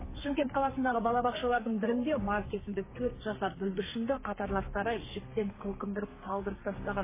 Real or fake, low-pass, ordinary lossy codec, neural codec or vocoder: fake; 3.6 kHz; MP3, 16 kbps; codec, 32 kHz, 1.9 kbps, SNAC